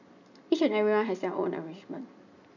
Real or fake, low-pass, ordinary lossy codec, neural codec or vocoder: real; 7.2 kHz; none; none